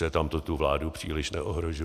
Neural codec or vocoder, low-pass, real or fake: none; 14.4 kHz; real